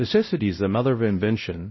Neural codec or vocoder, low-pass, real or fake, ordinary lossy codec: codec, 16 kHz, 0.5 kbps, X-Codec, WavLM features, trained on Multilingual LibriSpeech; 7.2 kHz; fake; MP3, 24 kbps